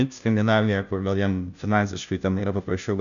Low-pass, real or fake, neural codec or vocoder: 7.2 kHz; fake; codec, 16 kHz, 0.5 kbps, FunCodec, trained on Chinese and English, 25 frames a second